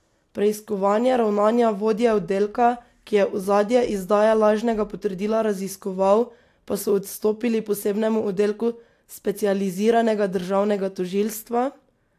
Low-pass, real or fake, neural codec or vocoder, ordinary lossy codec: 14.4 kHz; real; none; AAC, 64 kbps